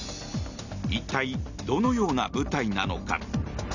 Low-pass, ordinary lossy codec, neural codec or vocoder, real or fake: 7.2 kHz; none; none; real